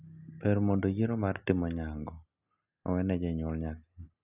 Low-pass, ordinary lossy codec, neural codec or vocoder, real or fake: 3.6 kHz; none; none; real